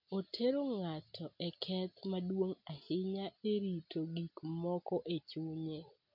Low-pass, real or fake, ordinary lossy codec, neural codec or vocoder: 5.4 kHz; real; MP3, 48 kbps; none